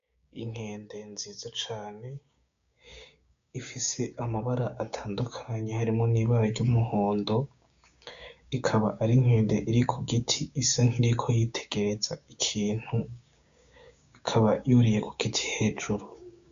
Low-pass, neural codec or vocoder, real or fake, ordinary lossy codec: 7.2 kHz; codec, 16 kHz, 6 kbps, DAC; fake; MP3, 64 kbps